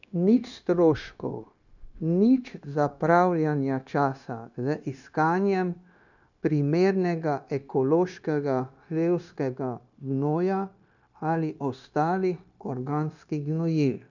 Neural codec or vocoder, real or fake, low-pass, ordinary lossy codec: codec, 16 kHz, 0.9 kbps, LongCat-Audio-Codec; fake; 7.2 kHz; none